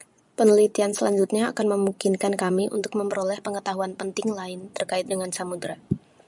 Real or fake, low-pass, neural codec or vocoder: fake; 10.8 kHz; vocoder, 24 kHz, 100 mel bands, Vocos